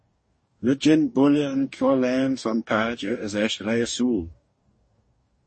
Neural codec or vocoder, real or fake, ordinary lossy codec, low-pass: codec, 44.1 kHz, 2.6 kbps, DAC; fake; MP3, 32 kbps; 10.8 kHz